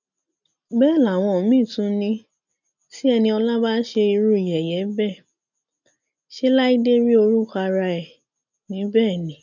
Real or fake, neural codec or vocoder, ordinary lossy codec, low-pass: real; none; none; 7.2 kHz